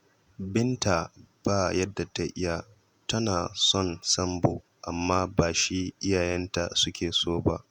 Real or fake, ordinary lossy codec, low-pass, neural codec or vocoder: fake; none; 19.8 kHz; vocoder, 48 kHz, 128 mel bands, Vocos